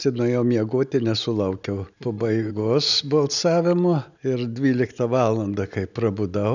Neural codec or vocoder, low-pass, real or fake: none; 7.2 kHz; real